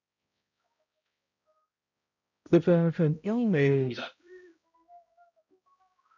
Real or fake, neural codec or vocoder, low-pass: fake; codec, 16 kHz, 0.5 kbps, X-Codec, HuBERT features, trained on balanced general audio; 7.2 kHz